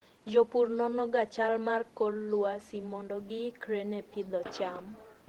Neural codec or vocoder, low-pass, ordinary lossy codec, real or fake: vocoder, 48 kHz, 128 mel bands, Vocos; 19.8 kHz; Opus, 16 kbps; fake